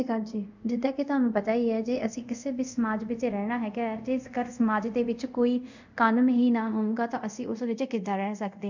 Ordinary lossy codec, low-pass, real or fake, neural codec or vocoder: none; 7.2 kHz; fake; codec, 24 kHz, 0.5 kbps, DualCodec